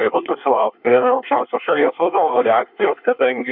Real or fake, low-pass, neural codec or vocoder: fake; 5.4 kHz; codec, 24 kHz, 1 kbps, SNAC